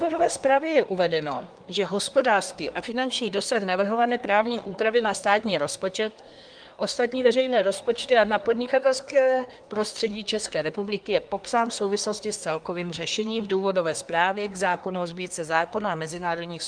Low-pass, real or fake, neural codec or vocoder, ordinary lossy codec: 9.9 kHz; fake; codec, 24 kHz, 1 kbps, SNAC; Opus, 32 kbps